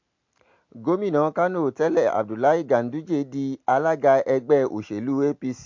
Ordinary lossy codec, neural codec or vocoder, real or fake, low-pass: MP3, 48 kbps; none; real; 7.2 kHz